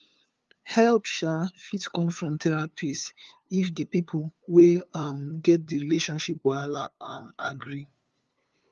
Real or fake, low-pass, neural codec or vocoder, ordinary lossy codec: fake; 7.2 kHz; codec, 16 kHz, 2 kbps, FunCodec, trained on LibriTTS, 25 frames a second; Opus, 32 kbps